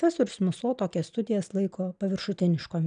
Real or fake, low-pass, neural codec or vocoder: real; 9.9 kHz; none